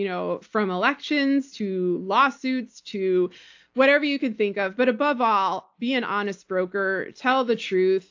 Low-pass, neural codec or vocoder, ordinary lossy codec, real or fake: 7.2 kHz; none; AAC, 48 kbps; real